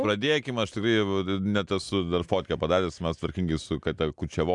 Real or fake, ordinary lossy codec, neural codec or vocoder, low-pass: real; MP3, 96 kbps; none; 10.8 kHz